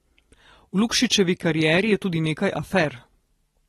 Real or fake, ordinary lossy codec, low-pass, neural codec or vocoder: real; AAC, 32 kbps; 14.4 kHz; none